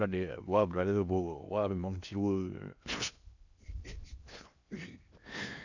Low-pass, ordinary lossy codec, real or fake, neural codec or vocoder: 7.2 kHz; none; fake; codec, 16 kHz in and 24 kHz out, 0.8 kbps, FocalCodec, streaming, 65536 codes